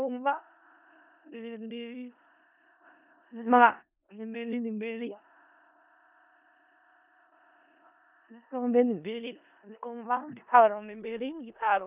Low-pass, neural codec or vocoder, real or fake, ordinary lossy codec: 3.6 kHz; codec, 16 kHz in and 24 kHz out, 0.4 kbps, LongCat-Audio-Codec, four codebook decoder; fake; none